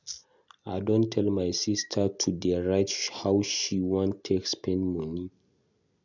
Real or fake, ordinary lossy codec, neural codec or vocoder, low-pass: real; none; none; 7.2 kHz